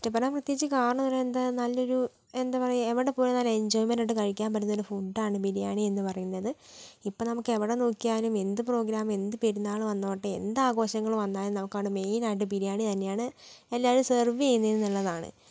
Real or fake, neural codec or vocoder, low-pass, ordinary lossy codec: real; none; none; none